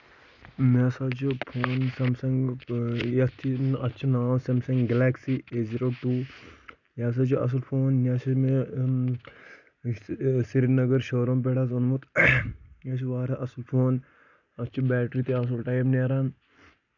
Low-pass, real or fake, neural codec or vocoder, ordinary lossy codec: 7.2 kHz; real; none; none